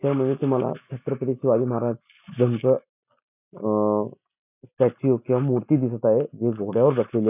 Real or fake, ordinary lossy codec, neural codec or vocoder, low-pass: real; none; none; 3.6 kHz